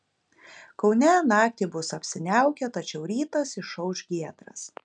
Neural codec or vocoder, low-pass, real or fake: none; 9.9 kHz; real